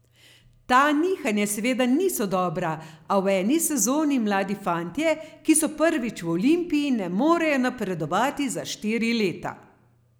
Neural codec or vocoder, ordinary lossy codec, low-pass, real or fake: none; none; none; real